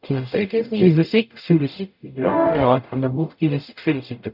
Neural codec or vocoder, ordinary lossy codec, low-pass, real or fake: codec, 44.1 kHz, 0.9 kbps, DAC; none; 5.4 kHz; fake